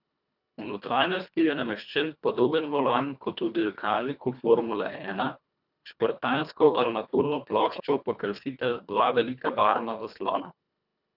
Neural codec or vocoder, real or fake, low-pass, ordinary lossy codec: codec, 24 kHz, 1.5 kbps, HILCodec; fake; 5.4 kHz; none